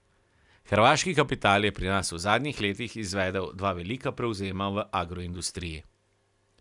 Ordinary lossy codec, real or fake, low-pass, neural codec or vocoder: none; real; 10.8 kHz; none